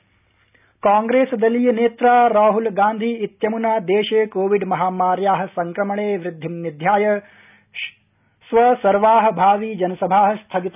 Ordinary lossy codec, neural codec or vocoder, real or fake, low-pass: none; none; real; 3.6 kHz